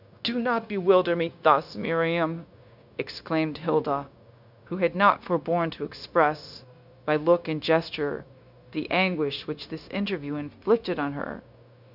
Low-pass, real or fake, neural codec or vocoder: 5.4 kHz; fake; codec, 16 kHz, 0.9 kbps, LongCat-Audio-Codec